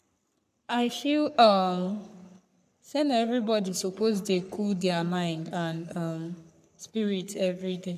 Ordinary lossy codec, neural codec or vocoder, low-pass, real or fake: none; codec, 44.1 kHz, 3.4 kbps, Pupu-Codec; 14.4 kHz; fake